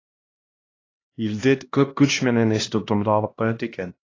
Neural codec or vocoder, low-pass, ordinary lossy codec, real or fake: codec, 16 kHz, 1 kbps, X-Codec, HuBERT features, trained on LibriSpeech; 7.2 kHz; AAC, 32 kbps; fake